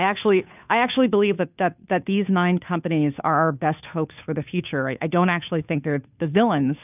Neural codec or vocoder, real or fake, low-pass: codec, 16 kHz, 2 kbps, FunCodec, trained on Chinese and English, 25 frames a second; fake; 3.6 kHz